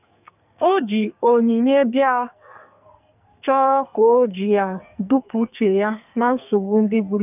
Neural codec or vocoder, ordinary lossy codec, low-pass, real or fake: codec, 44.1 kHz, 2.6 kbps, SNAC; none; 3.6 kHz; fake